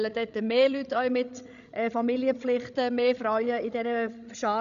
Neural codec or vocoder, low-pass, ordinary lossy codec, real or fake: codec, 16 kHz, 16 kbps, FreqCodec, larger model; 7.2 kHz; none; fake